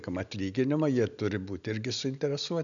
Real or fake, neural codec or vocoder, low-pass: real; none; 7.2 kHz